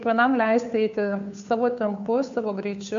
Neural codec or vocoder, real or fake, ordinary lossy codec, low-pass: codec, 16 kHz, 2 kbps, FunCodec, trained on Chinese and English, 25 frames a second; fake; AAC, 64 kbps; 7.2 kHz